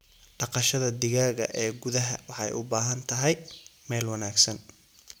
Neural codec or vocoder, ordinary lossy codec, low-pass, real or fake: none; none; none; real